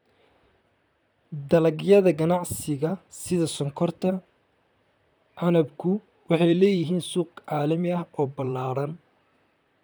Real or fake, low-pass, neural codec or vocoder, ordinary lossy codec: fake; none; vocoder, 44.1 kHz, 128 mel bands, Pupu-Vocoder; none